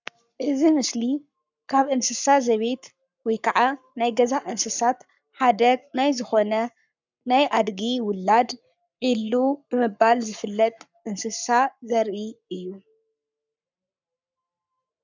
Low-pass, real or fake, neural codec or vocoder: 7.2 kHz; fake; codec, 44.1 kHz, 7.8 kbps, Pupu-Codec